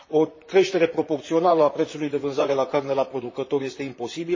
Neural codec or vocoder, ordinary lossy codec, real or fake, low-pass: vocoder, 44.1 kHz, 128 mel bands, Pupu-Vocoder; MP3, 32 kbps; fake; 7.2 kHz